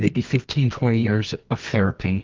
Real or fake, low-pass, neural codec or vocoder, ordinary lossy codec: fake; 7.2 kHz; codec, 24 kHz, 0.9 kbps, WavTokenizer, medium music audio release; Opus, 24 kbps